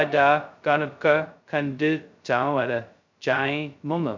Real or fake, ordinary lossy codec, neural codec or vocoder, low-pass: fake; MP3, 64 kbps; codec, 16 kHz, 0.2 kbps, FocalCodec; 7.2 kHz